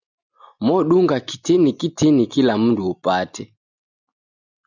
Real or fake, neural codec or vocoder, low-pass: real; none; 7.2 kHz